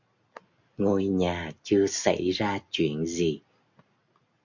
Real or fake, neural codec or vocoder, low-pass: real; none; 7.2 kHz